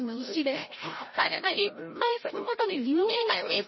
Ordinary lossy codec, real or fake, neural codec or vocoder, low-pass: MP3, 24 kbps; fake; codec, 16 kHz, 0.5 kbps, FreqCodec, larger model; 7.2 kHz